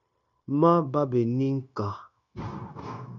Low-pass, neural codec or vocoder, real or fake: 7.2 kHz; codec, 16 kHz, 0.9 kbps, LongCat-Audio-Codec; fake